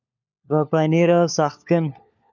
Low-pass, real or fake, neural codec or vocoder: 7.2 kHz; fake; codec, 16 kHz, 4 kbps, FunCodec, trained on LibriTTS, 50 frames a second